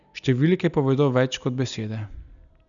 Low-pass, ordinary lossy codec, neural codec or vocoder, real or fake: 7.2 kHz; none; none; real